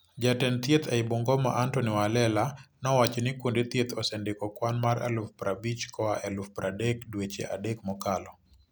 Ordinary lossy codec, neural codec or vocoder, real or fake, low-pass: none; none; real; none